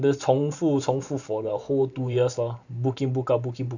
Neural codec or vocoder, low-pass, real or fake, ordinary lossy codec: vocoder, 44.1 kHz, 128 mel bands every 512 samples, BigVGAN v2; 7.2 kHz; fake; none